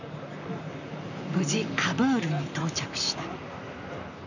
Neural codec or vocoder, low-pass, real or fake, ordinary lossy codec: vocoder, 44.1 kHz, 128 mel bands, Pupu-Vocoder; 7.2 kHz; fake; none